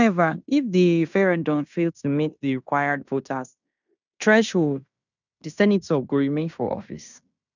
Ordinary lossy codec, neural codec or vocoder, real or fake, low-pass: none; codec, 16 kHz in and 24 kHz out, 0.9 kbps, LongCat-Audio-Codec, fine tuned four codebook decoder; fake; 7.2 kHz